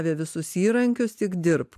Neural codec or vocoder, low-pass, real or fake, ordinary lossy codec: none; 14.4 kHz; real; AAC, 96 kbps